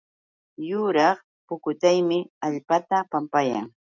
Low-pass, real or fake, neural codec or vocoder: 7.2 kHz; real; none